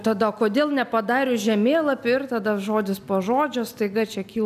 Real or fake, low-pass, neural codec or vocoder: real; 14.4 kHz; none